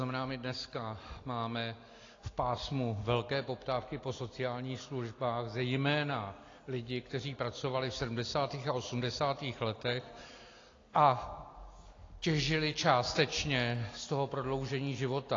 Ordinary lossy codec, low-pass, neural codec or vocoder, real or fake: AAC, 32 kbps; 7.2 kHz; none; real